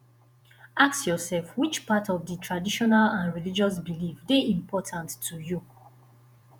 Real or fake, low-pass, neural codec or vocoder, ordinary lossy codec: fake; none; vocoder, 48 kHz, 128 mel bands, Vocos; none